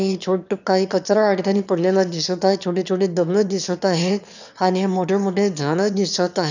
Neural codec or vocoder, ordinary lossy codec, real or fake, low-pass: autoencoder, 22.05 kHz, a latent of 192 numbers a frame, VITS, trained on one speaker; none; fake; 7.2 kHz